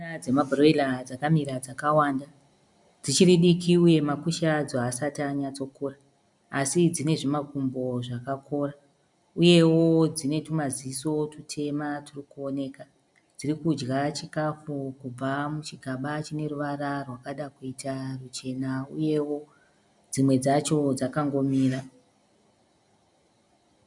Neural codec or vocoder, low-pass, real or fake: none; 10.8 kHz; real